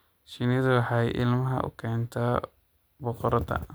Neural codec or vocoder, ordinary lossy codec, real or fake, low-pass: none; none; real; none